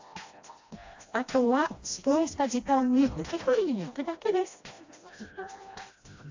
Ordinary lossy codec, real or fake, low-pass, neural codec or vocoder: AAC, 48 kbps; fake; 7.2 kHz; codec, 16 kHz, 1 kbps, FreqCodec, smaller model